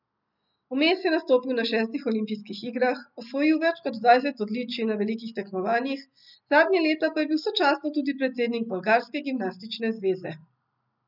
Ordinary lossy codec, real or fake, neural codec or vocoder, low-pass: none; real; none; 5.4 kHz